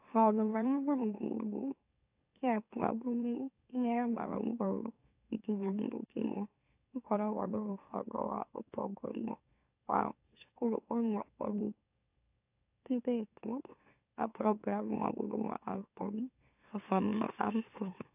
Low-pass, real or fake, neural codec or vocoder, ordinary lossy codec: 3.6 kHz; fake; autoencoder, 44.1 kHz, a latent of 192 numbers a frame, MeloTTS; AAC, 32 kbps